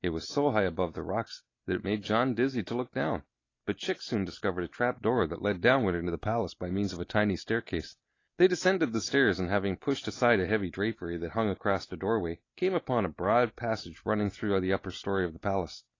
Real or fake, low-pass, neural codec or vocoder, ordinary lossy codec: real; 7.2 kHz; none; AAC, 32 kbps